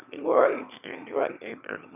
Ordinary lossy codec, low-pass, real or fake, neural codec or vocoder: none; 3.6 kHz; fake; autoencoder, 22.05 kHz, a latent of 192 numbers a frame, VITS, trained on one speaker